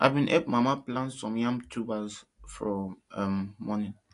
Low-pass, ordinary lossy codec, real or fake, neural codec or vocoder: 10.8 kHz; AAC, 48 kbps; real; none